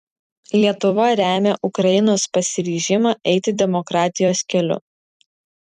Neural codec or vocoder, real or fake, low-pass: vocoder, 44.1 kHz, 128 mel bands every 256 samples, BigVGAN v2; fake; 14.4 kHz